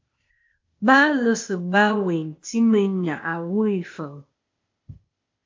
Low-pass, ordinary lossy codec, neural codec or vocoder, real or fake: 7.2 kHz; MP3, 48 kbps; codec, 16 kHz, 0.8 kbps, ZipCodec; fake